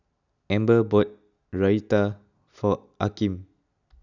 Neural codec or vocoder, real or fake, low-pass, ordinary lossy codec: none; real; 7.2 kHz; none